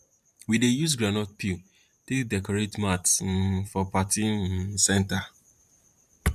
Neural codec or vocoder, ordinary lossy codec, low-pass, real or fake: vocoder, 44.1 kHz, 128 mel bands every 512 samples, BigVGAN v2; none; 14.4 kHz; fake